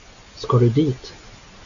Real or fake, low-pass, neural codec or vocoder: real; 7.2 kHz; none